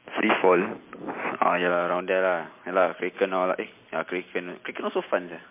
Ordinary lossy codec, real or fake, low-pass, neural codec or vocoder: MP3, 24 kbps; real; 3.6 kHz; none